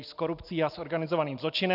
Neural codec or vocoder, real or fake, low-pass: none; real; 5.4 kHz